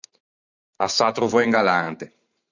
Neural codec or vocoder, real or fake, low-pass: vocoder, 44.1 kHz, 128 mel bands every 512 samples, BigVGAN v2; fake; 7.2 kHz